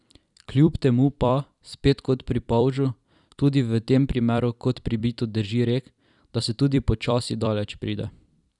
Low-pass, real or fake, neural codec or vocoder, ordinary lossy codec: 10.8 kHz; fake; vocoder, 44.1 kHz, 128 mel bands every 256 samples, BigVGAN v2; none